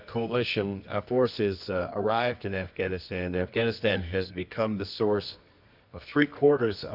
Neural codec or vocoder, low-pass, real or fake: codec, 24 kHz, 0.9 kbps, WavTokenizer, medium music audio release; 5.4 kHz; fake